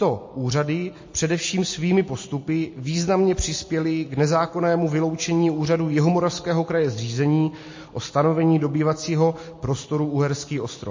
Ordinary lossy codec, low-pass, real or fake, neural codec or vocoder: MP3, 32 kbps; 7.2 kHz; real; none